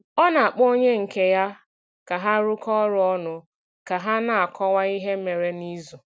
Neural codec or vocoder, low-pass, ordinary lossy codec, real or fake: none; none; none; real